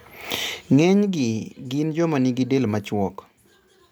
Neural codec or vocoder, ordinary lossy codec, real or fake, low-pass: none; none; real; none